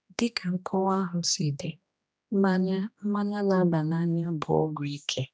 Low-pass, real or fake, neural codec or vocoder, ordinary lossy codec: none; fake; codec, 16 kHz, 1 kbps, X-Codec, HuBERT features, trained on general audio; none